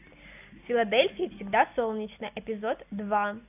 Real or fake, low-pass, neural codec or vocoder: real; 3.6 kHz; none